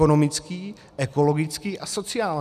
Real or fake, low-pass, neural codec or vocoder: real; 14.4 kHz; none